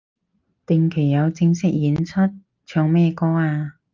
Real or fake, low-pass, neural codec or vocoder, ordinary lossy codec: real; 7.2 kHz; none; Opus, 24 kbps